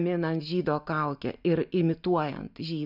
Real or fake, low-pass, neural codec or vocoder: real; 5.4 kHz; none